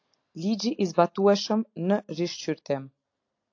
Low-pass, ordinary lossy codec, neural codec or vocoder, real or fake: 7.2 kHz; AAC, 48 kbps; none; real